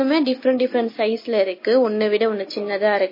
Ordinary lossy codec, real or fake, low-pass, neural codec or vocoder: MP3, 24 kbps; real; 5.4 kHz; none